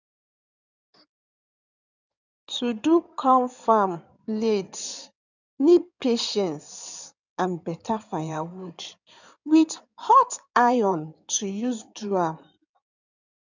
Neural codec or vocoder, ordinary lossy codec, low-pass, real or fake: codec, 16 kHz in and 24 kHz out, 2.2 kbps, FireRedTTS-2 codec; none; 7.2 kHz; fake